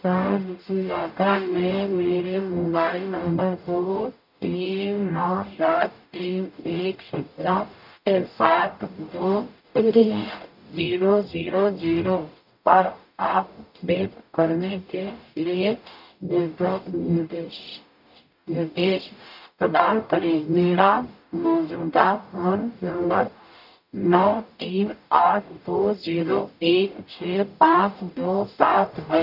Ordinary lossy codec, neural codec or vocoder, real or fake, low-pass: none; codec, 44.1 kHz, 0.9 kbps, DAC; fake; 5.4 kHz